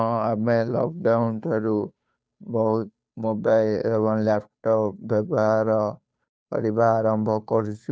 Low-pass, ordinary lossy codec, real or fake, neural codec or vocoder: none; none; fake; codec, 16 kHz, 2 kbps, FunCodec, trained on Chinese and English, 25 frames a second